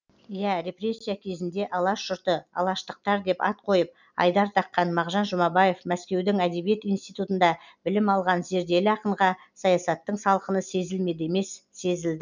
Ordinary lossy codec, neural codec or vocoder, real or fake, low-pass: none; none; real; 7.2 kHz